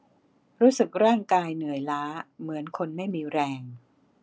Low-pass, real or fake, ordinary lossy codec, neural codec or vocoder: none; real; none; none